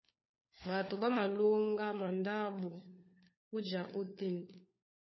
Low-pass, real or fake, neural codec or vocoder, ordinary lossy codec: 7.2 kHz; fake; codec, 16 kHz, 4 kbps, FunCodec, trained on LibriTTS, 50 frames a second; MP3, 24 kbps